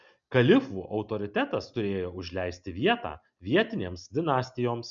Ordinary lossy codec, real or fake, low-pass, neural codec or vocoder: MP3, 96 kbps; real; 7.2 kHz; none